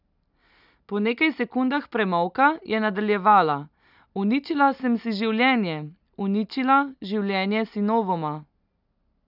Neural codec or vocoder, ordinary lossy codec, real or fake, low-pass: none; none; real; 5.4 kHz